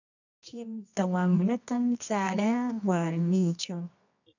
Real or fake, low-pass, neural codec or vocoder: fake; 7.2 kHz; codec, 24 kHz, 0.9 kbps, WavTokenizer, medium music audio release